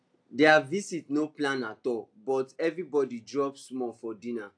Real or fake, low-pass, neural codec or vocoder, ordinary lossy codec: real; 9.9 kHz; none; AAC, 64 kbps